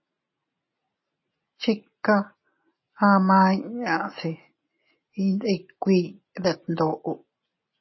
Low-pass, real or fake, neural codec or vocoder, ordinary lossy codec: 7.2 kHz; real; none; MP3, 24 kbps